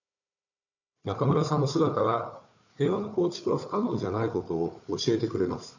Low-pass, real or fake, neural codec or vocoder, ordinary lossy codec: 7.2 kHz; fake; codec, 16 kHz, 4 kbps, FunCodec, trained on Chinese and English, 50 frames a second; none